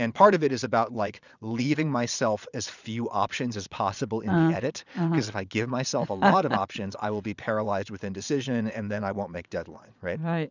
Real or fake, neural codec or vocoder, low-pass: fake; vocoder, 22.05 kHz, 80 mel bands, Vocos; 7.2 kHz